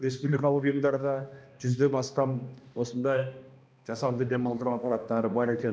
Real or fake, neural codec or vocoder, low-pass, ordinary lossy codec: fake; codec, 16 kHz, 1 kbps, X-Codec, HuBERT features, trained on balanced general audio; none; none